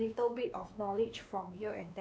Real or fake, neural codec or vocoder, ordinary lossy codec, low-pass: fake; codec, 16 kHz, 2 kbps, X-Codec, WavLM features, trained on Multilingual LibriSpeech; none; none